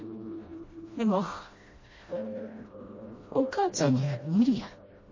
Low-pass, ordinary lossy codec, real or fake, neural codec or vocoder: 7.2 kHz; MP3, 32 kbps; fake; codec, 16 kHz, 1 kbps, FreqCodec, smaller model